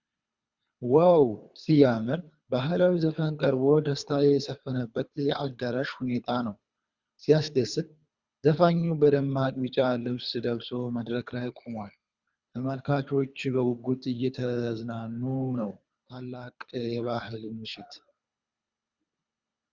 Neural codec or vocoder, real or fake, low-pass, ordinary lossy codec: codec, 24 kHz, 3 kbps, HILCodec; fake; 7.2 kHz; Opus, 64 kbps